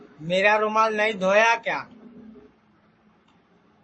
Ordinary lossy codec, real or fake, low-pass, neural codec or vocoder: MP3, 32 kbps; fake; 9.9 kHz; codec, 44.1 kHz, 7.8 kbps, Pupu-Codec